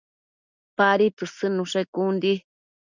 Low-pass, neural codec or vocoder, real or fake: 7.2 kHz; none; real